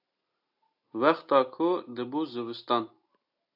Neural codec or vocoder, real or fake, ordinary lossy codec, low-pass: none; real; AAC, 48 kbps; 5.4 kHz